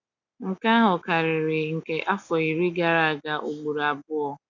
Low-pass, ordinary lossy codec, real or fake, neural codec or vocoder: 7.2 kHz; none; real; none